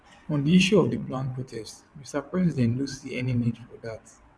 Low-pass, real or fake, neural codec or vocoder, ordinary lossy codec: none; fake; vocoder, 22.05 kHz, 80 mel bands, WaveNeXt; none